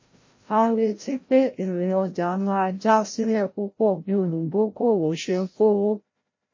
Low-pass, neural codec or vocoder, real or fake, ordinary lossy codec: 7.2 kHz; codec, 16 kHz, 0.5 kbps, FreqCodec, larger model; fake; MP3, 32 kbps